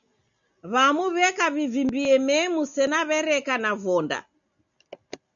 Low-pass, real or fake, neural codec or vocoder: 7.2 kHz; real; none